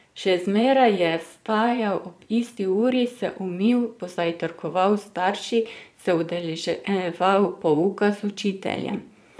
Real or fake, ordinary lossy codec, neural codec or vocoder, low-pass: fake; none; vocoder, 22.05 kHz, 80 mel bands, Vocos; none